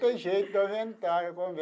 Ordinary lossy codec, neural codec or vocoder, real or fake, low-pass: none; none; real; none